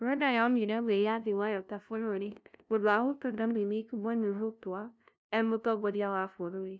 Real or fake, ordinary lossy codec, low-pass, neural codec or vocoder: fake; none; none; codec, 16 kHz, 0.5 kbps, FunCodec, trained on LibriTTS, 25 frames a second